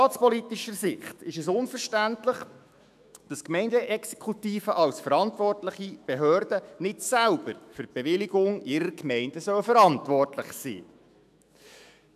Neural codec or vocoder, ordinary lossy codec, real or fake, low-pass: autoencoder, 48 kHz, 128 numbers a frame, DAC-VAE, trained on Japanese speech; none; fake; 14.4 kHz